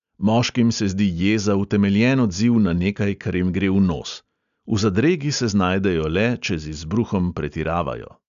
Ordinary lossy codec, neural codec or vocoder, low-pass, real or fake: MP3, 96 kbps; none; 7.2 kHz; real